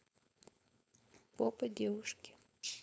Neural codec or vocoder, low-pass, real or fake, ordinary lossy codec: codec, 16 kHz, 4.8 kbps, FACodec; none; fake; none